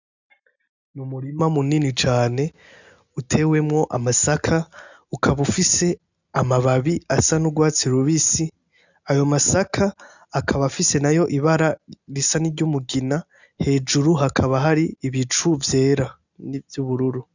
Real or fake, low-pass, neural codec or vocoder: real; 7.2 kHz; none